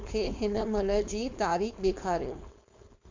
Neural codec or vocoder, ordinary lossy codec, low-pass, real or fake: codec, 16 kHz, 4.8 kbps, FACodec; MP3, 64 kbps; 7.2 kHz; fake